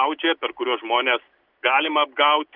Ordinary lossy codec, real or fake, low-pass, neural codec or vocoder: Opus, 24 kbps; real; 5.4 kHz; none